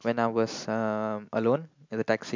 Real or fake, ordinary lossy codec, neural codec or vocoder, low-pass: real; MP3, 64 kbps; none; 7.2 kHz